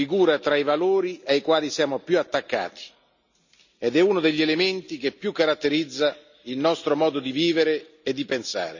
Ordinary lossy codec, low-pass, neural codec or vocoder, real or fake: none; 7.2 kHz; none; real